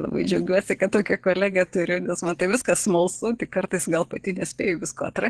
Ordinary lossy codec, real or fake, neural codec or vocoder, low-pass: Opus, 16 kbps; fake; vocoder, 22.05 kHz, 80 mel bands, WaveNeXt; 9.9 kHz